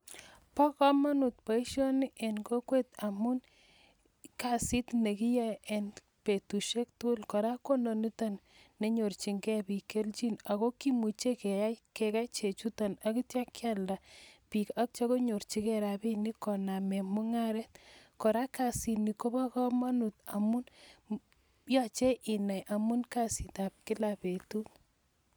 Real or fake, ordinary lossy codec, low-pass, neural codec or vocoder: real; none; none; none